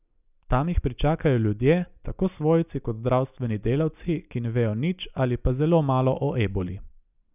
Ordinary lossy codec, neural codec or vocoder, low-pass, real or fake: none; none; 3.6 kHz; real